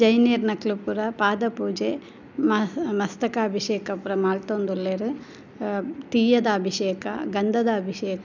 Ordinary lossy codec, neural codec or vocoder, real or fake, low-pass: none; none; real; 7.2 kHz